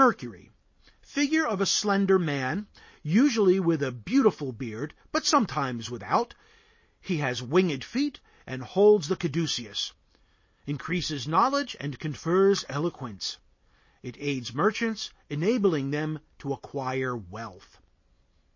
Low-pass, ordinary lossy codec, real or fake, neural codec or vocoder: 7.2 kHz; MP3, 32 kbps; real; none